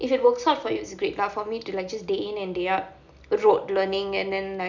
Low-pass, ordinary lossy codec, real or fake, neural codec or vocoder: 7.2 kHz; none; real; none